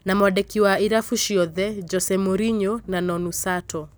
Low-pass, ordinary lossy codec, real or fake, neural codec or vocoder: none; none; real; none